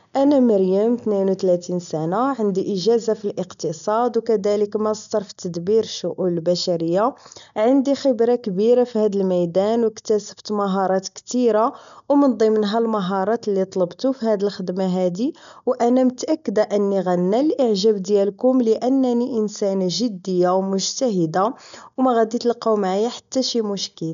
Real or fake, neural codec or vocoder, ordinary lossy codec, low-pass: real; none; none; 7.2 kHz